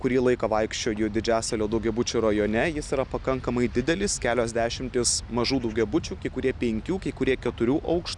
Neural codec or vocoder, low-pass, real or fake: none; 10.8 kHz; real